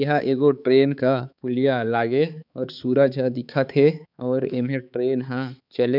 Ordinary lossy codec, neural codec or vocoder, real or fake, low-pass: none; codec, 16 kHz, 4 kbps, X-Codec, HuBERT features, trained on balanced general audio; fake; 5.4 kHz